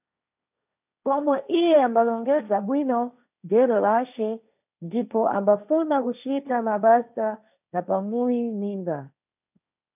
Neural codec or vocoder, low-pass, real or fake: codec, 16 kHz, 1.1 kbps, Voila-Tokenizer; 3.6 kHz; fake